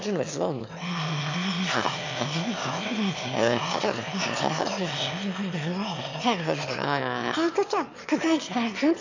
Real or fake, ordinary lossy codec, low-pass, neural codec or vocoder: fake; AAC, 48 kbps; 7.2 kHz; autoencoder, 22.05 kHz, a latent of 192 numbers a frame, VITS, trained on one speaker